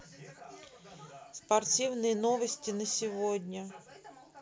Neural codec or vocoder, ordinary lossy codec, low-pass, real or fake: none; none; none; real